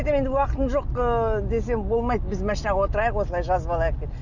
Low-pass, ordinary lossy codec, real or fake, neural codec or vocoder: 7.2 kHz; none; real; none